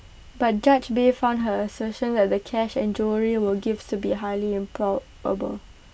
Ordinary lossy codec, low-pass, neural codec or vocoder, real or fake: none; none; none; real